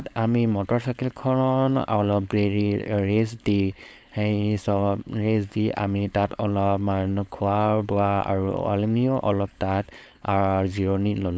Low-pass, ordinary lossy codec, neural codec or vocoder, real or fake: none; none; codec, 16 kHz, 4.8 kbps, FACodec; fake